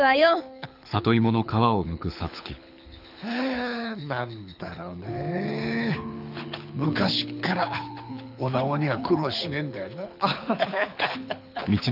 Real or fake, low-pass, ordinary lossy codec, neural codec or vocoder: fake; 5.4 kHz; none; codec, 24 kHz, 6 kbps, HILCodec